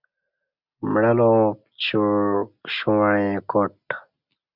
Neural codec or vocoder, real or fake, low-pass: none; real; 5.4 kHz